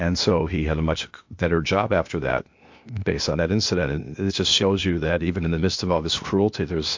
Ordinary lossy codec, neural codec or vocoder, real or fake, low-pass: MP3, 48 kbps; codec, 16 kHz, 0.8 kbps, ZipCodec; fake; 7.2 kHz